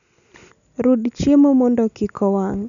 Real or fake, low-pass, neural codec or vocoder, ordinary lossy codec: real; 7.2 kHz; none; none